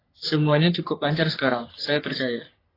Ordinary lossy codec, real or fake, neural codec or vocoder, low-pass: AAC, 24 kbps; fake; codec, 44.1 kHz, 3.4 kbps, Pupu-Codec; 5.4 kHz